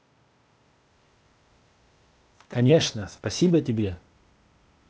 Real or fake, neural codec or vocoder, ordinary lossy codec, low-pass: fake; codec, 16 kHz, 0.8 kbps, ZipCodec; none; none